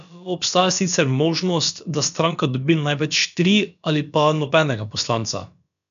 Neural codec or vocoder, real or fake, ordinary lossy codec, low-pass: codec, 16 kHz, about 1 kbps, DyCAST, with the encoder's durations; fake; none; 7.2 kHz